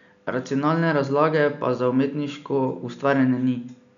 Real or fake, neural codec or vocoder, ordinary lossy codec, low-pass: real; none; none; 7.2 kHz